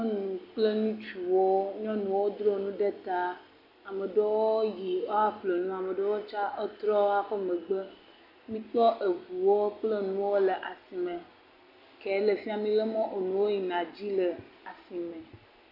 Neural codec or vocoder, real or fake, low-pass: none; real; 5.4 kHz